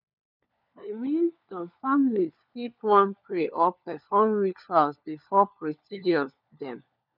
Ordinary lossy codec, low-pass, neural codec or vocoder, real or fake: none; 5.4 kHz; codec, 16 kHz, 4 kbps, FunCodec, trained on LibriTTS, 50 frames a second; fake